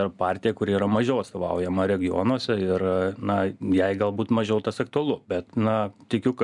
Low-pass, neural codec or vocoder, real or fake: 10.8 kHz; none; real